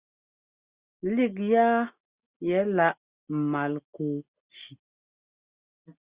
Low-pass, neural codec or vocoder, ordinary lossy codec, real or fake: 3.6 kHz; none; Opus, 64 kbps; real